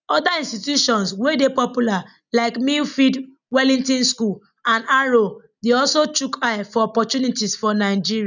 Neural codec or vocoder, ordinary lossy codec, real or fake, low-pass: none; none; real; 7.2 kHz